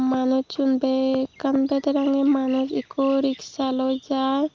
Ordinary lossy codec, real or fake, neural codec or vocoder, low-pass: Opus, 32 kbps; real; none; 7.2 kHz